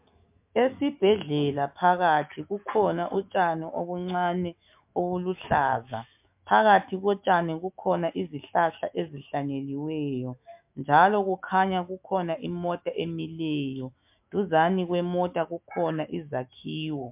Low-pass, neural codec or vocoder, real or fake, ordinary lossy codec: 3.6 kHz; autoencoder, 48 kHz, 128 numbers a frame, DAC-VAE, trained on Japanese speech; fake; MP3, 32 kbps